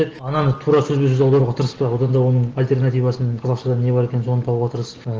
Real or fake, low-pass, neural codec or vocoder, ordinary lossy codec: real; 7.2 kHz; none; Opus, 16 kbps